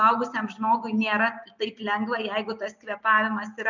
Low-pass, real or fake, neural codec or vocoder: 7.2 kHz; real; none